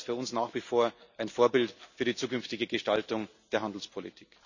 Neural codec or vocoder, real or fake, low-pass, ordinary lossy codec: none; real; 7.2 kHz; none